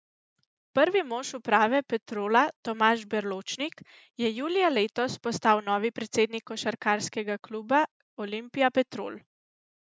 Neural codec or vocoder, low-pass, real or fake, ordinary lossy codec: none; none; real; none